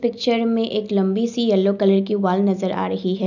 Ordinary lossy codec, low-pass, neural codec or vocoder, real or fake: none; 7.2 kHz; none; real